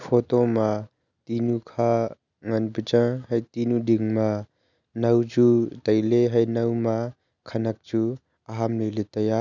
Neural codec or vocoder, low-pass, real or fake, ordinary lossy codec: none; 7.2 kHz; real; none